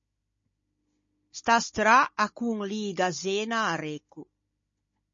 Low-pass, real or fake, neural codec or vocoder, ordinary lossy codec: 7.2 kHz; fake; codec, 16 kHz, 16 kbps, FunCodec, trained on Chinese and English, 50 frames a second; MP3, 32 kbps